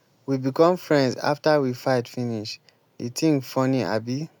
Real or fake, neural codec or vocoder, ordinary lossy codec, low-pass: real; none; none; none